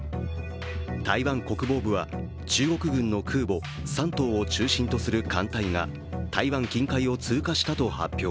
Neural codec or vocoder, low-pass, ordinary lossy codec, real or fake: none; none; none; real